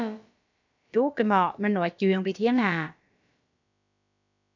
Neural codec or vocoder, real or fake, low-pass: codec, 16 kHz, about 1 kbps, DyCAST, with the encoder's durations; fake; 7.2 kHz